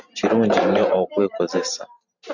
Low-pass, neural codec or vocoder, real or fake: 7.2 kHz; vocoder, 44.1 kHz, 128 mel bands every 512 samples, BigVGAN v2; fake